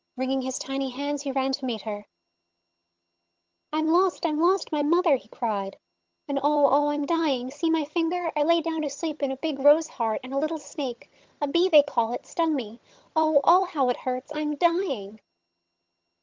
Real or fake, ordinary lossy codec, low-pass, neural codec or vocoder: fake; Opus, 24 kbps; 7.2 kHz; vocoder, 22.05 kHz, 80 mel bands, HiFi-GAN